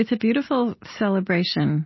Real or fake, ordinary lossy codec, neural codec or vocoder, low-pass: real; MP3, 24 kbps; none; 7.2 kHz